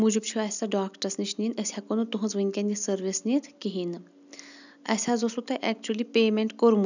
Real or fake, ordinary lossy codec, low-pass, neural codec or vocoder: real; none; 7.2 kHz; none